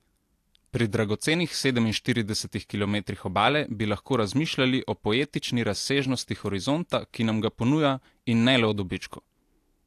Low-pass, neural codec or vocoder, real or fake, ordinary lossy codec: 14.4 kHz; none; real; AAC, 64 kbps